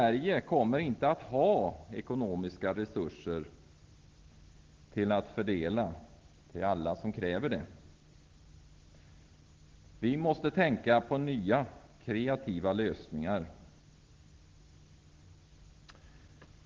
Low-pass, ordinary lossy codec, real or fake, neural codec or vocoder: 7.2 kHz; Opus, 16 kbps; real; none